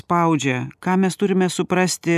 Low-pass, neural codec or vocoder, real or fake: 14.4 kHz; none; real